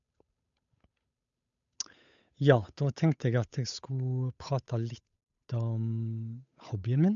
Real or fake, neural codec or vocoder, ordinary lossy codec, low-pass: fake; codec, 16 kHz, 8 kbps, FunCodec, trained on Chinese and English, 25 frames a second; MP3, 96 kbps; 7.2 kHz